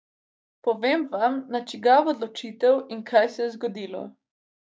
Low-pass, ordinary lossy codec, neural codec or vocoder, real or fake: none; none; codec, 16 kHz, 6 kbps, DAC; fake